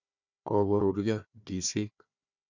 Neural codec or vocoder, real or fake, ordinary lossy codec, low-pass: codec, 16 kHz, 1 kbps, FunCodec, trained on Chinese and English, 50 frames a second; fake; none; 7.2 kHz